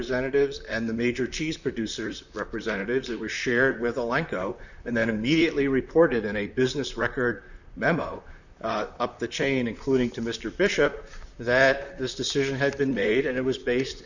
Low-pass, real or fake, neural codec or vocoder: 7.2 kHz; fake; vocoder, 44.1 kHz, 128 mel bands, Pupu-Vocoder